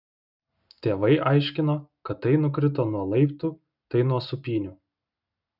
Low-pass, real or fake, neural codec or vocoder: 5.4 kHz; real; none